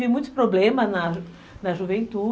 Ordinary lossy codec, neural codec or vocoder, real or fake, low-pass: none; none; real; none